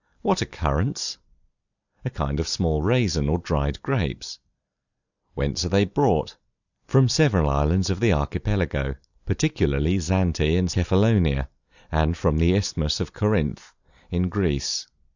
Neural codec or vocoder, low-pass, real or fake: vocoder, 44.1 kHz, 128 mel bands every 256 samples, BigVGAN v2; 7.2 kHz; fake